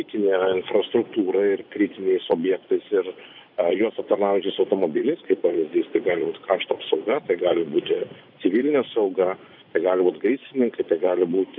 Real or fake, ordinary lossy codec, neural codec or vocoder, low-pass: fake; AAC, 48 kbps; vocoder, 24 kHz, 100 mel bands, Vocos; 5.4 kHz